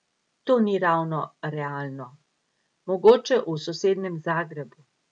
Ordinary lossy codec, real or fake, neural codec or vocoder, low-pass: none; real; none; 9.9 kHz